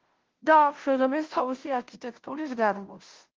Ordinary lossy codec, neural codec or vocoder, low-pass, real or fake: Opus, 16 kbps; codec, 16 kHz, 0.5 kbps, FunCodec, trained on Chinese and English, 25 frames a second; 7.2 kHz; fake